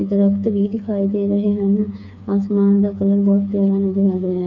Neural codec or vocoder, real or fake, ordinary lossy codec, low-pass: codec, 16 kHz, 4 kbps, FreqCodec, smaller model; fake; none; 7.2 kHz